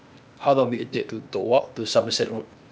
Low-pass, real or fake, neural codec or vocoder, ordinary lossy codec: none; fake; codec, 16 kHz, 0.8 kbps, ZipCodec; none